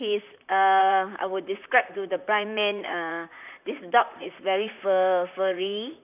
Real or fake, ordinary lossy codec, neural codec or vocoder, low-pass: fake; none; vocoder, 44.1 kHz, 128 mel bands, Pupu-Vocoder; 3.6 kHz